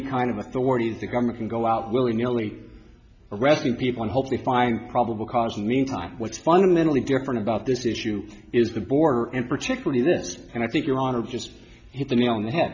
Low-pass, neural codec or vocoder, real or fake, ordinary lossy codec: 7.2 kHz; none; real; MP3, 64 kbps